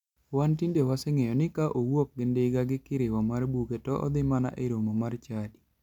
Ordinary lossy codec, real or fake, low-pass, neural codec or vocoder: none; real; 19.8 kHz; none